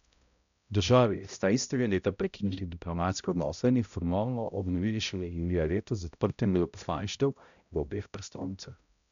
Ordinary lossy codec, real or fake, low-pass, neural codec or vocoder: MP3, 96 kbps; fake; 7.2 kHz; codec, 16 kHz, 0.5 kbps, X-Codec, HuBERT features, trained on balanced general audio